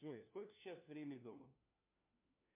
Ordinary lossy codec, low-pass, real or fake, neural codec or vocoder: MP3, 32 kbps; 3.6 kHz; fake; codec, 16 kHz, 1 kbps, FunCodec, trained on LibriTTS, 50 frames a second